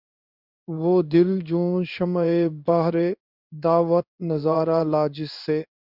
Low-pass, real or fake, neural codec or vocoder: 5.4 kHz; fake; codec, 16 kHz in and 24 kHz out, 1 kbps, XY-Tokenizer